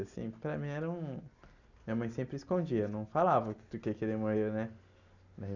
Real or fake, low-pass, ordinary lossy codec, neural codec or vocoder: real; 7.2 kHz; none; none